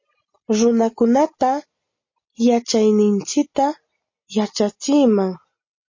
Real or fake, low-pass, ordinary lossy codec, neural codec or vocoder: real; 7.2 kHz; MP3, 32 kbps; none